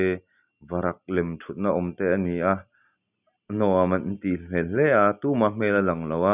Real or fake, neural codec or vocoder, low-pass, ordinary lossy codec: real; none; 3.6 kHz; none